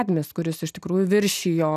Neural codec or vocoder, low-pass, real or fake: none; 14.4 kHz; real